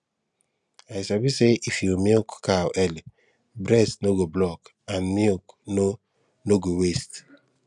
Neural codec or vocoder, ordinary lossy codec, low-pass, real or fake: none; none; 10.8 kHz; real